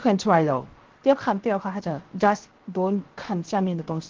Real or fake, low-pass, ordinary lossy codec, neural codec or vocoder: fake; 7.2 kHz; Opus, 16 kbps; codec, 16 kHz, 0.7 kbps, FocalCodec